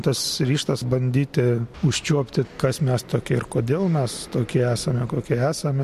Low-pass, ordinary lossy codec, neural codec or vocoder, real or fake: 14.4 kHz; MP3, 64 kbps; none; real